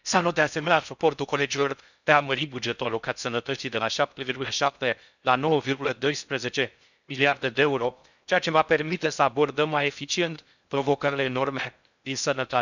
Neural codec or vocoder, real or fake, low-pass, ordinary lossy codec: codec, 16 kHz in and 24 kHz out, 0.6 kbps, FocalCodec, streaming, 4096 codes; fake; 7.2 kHz; none